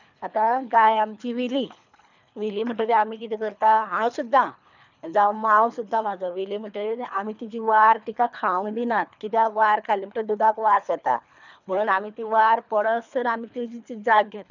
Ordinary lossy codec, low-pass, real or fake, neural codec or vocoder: none; 7.2 kHz; fake; codec, 24 kHz, 3 kbps, HILCodec